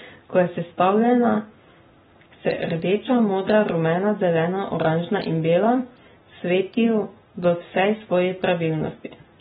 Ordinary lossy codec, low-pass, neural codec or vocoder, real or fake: AAC, 16 kbps; 19.8 kHz; none; real